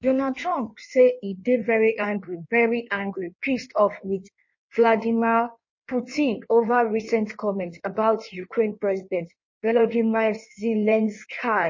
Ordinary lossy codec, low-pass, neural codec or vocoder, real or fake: MP3, 32 kbps; 7.2 kHz; codec, 16 kHz in and 24 kHz out, 1.1 kbps, FireRedTTS-2 codec; fake